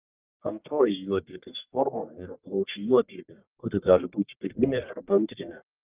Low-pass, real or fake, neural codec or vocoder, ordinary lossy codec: 3.6 kHz; fake; codec, 44.1 kHz, 1.7 kbps, Pupu-Codec; Opus, 32 kbps